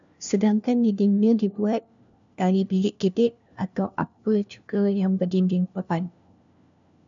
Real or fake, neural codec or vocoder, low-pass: fake; codec, 16 kHz, 1 kbps, FunCodec, trained on LibriTTS, 50 frames a second; 7.2 kHz